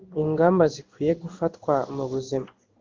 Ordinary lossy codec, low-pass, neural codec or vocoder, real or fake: Opus, 32 kbps; 7.2 kHz; codec, 16 kHz in and 24 kHz out, 1 kbps, XY-Tokenizer; fake